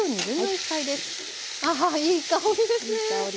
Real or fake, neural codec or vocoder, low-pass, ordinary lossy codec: real; none; none; none